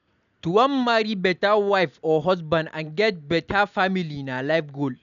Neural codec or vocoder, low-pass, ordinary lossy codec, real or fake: none; 7.2 kHz; none; real